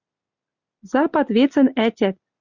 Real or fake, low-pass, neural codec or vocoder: real; 7.2 kHz; none